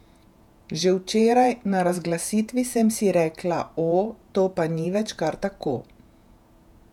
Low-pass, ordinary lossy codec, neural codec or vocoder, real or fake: 19.8 kHz; none; vocoder, 48 kHz, 128 mel bands, Vocos; fake